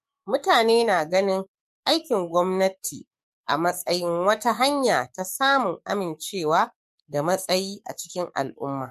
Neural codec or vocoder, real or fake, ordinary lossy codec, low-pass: codec, 44.1 kHz, 7.8 kbps, DAC; fake; MP3, 64 kbps; 14.4 kHz